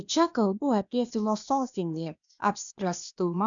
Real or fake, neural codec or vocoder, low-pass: fake; codec, 16 kHz, 0.8 kbps, ZipCodec; 7.2 kHz